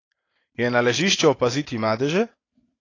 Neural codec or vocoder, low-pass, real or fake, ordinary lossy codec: none; 7.2 kHz; real; AAC, 32 kbps